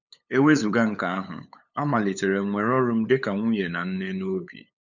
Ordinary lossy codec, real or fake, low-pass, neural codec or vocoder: none; fake; 7.2 kHz; codec, 16 kHz, 8 kbps, FunCodec, trained on LibriTTS, 25 frames a second